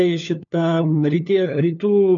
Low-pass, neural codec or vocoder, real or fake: 7.2 kHz; codec, 16 kHz, 4 kbps, FunCodec, trained on LibriTTS, 50 frames a second; fake